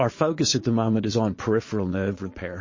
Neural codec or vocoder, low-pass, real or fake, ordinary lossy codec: vocoder, 22.05 kHz, 80 mel bands, Vocos; 7.2 kHz; fake; MP3, 32 kbps